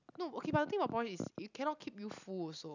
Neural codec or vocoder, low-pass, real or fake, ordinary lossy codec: none; 7.2 kHz; real; none